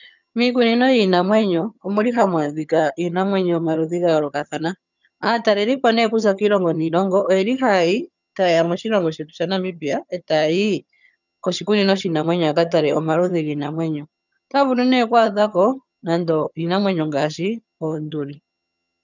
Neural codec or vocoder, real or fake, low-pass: vocoder, 22.05 kHz, 80 mel bands, HiFi-GAN; fake; 7.2 kHz